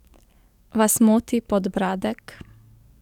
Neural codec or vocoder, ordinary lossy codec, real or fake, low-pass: codec, 44.1 kHz, 7.8 kbps, DAC; none; fake; 19.8 kHz